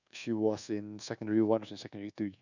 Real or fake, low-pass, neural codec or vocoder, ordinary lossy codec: fake; 7.2 kHz; codec, 24 kHz, 1.2 kbps, DualCodec; none